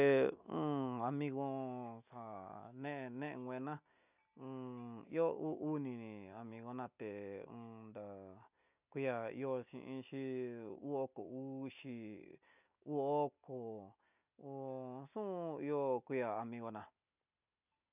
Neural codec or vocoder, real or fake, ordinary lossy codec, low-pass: none; real; none; 3.6 kHz